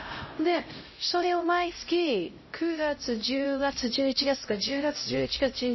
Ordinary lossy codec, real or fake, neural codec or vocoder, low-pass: MP3, 24 kbps; fake; codec, 16 kHz, 0.5 kbps, X-Codec, HuBERT features, trained on LibriSpeech; 7.2 kHz